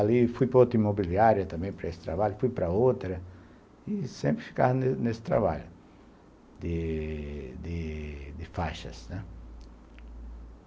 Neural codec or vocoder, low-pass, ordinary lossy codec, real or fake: none; none; none; real